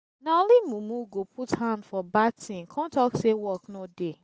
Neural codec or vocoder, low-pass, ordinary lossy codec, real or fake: none; none; none; real